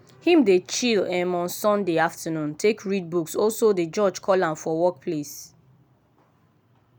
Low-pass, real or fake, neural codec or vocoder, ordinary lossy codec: none; real; none; none